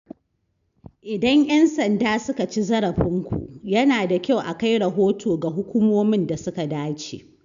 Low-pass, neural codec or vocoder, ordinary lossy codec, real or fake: 7.2 kHz; none; none; real